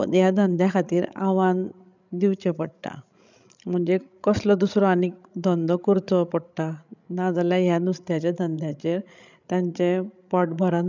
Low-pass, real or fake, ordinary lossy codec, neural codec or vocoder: 7.2 kHz; fake; none; codec, 16 kHz, 16 kbps, FreqCodec, larger model